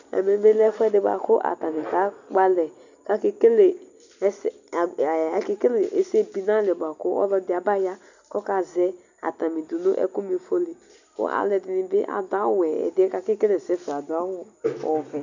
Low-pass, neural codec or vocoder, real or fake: 7.2 kHz; none; real